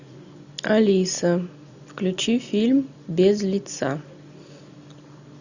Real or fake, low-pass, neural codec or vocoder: real; 7.2 kHz; none